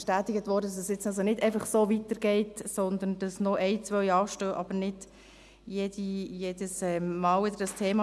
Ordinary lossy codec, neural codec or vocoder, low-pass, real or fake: none; none; none; real